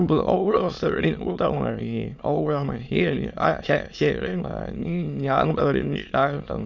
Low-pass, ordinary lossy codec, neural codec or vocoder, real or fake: 7.2 kHz; none; autoencoder, 22.05 kHz, a latent of 192 numbers a frame, VITS, trained on many speakers; fake